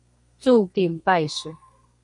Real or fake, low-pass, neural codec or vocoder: fake; 10.8 kHz; codec, 32 kHz, 1.9 kbps, SNAC